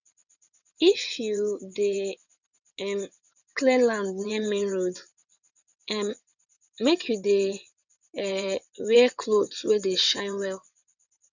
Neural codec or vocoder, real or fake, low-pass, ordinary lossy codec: vocoder, 22.05 kHz, 80 mel bands, WaveNeXt; fake; 7.2 kHz; none